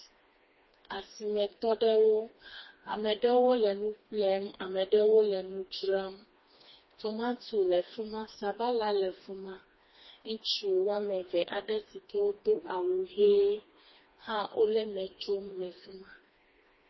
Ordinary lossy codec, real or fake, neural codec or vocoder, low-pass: MP3, 24 kbps; fake; codec, 16 kHz, 2 kbps, FreqCodec, smaller model; 7.2 kHz